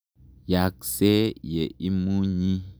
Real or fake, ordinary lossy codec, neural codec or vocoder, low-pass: real; none; none; none